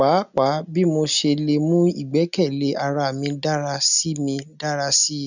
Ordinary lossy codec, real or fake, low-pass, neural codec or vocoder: none; real; 7.2 kHz; none